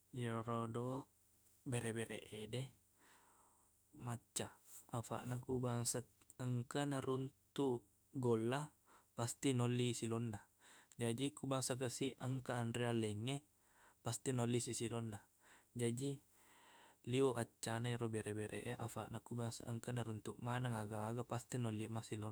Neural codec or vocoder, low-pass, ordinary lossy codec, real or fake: autoencoder, 48 kHz, 32 numbers a frame, DAC-VAE, trained on Japanese speech; none; none; fake